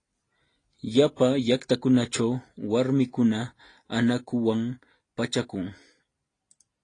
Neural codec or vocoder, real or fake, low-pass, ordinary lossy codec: none; real; 9.9 kHz; AAC, 32 kbps